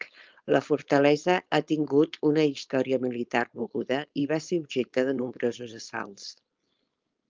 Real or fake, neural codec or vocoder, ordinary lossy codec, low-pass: fake; codec, 16 kHz, 4.8 kbps, FACodec; Opus, 24 kbps; 7.2 kHz